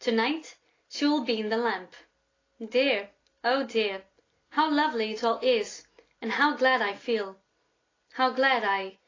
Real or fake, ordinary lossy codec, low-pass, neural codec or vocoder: real; AAC, 32 kbps; 7.2 kHz; none